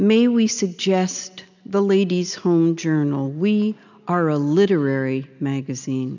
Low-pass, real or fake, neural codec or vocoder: 7.2 kHz; real; none